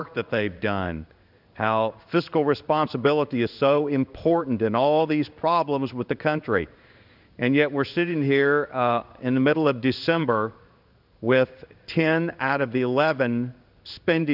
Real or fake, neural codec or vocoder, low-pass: fake; codec, 16 kHz in and 24 kHz out, 1 kbps, XY-Tokenizer; 5.4 kHz